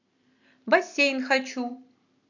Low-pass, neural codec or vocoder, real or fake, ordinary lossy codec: 7.2 kHz; none; real; none